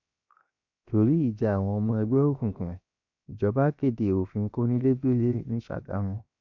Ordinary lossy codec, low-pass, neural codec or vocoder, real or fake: none; 7.2 kHz; codec, 16 kHz, 0.7 kbps, FocalCodec; fake